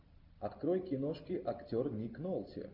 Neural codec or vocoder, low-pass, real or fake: none; 5.4 kHz; real